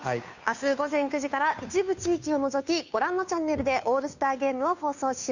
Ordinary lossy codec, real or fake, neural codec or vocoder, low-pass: none; fake; codec, 16 kHz, 2 kbps, FunCodec, trained on Chinese and English, 25 frames a second; 7.2 kHz